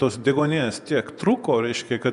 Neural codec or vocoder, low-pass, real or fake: vocoder, 24 kHz, 100 mel bands, Vocos; 10.8 kHz; fake